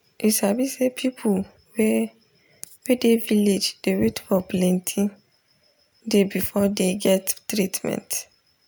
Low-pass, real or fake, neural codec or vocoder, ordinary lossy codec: none; real; none; none